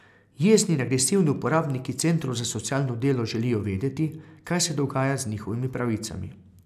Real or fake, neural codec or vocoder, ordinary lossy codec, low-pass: real; none; none; 14.4 kHz